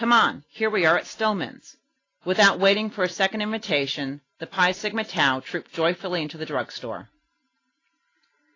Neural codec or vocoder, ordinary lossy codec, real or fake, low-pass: none; AAC, 32 kbps; real; 7.2 kHz